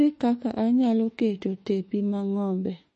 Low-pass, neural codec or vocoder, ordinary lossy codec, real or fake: 10.8 kHz; autoencoder, 48 kHz, 32 numbers a frame, DAC-VAE, trained on Japanese speech; MP3, 32 kbps; fake